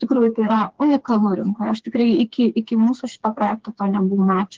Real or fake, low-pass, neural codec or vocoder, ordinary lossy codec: fake; 7.2 kHz; codec, 16 kHz, 4 kbps, FreqCodec, smaller model; Opus, 16 kbps